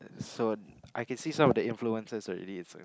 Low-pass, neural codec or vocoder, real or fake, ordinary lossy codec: none; none; real; none